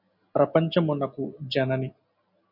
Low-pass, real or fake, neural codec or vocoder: 5.4 kHz; real; none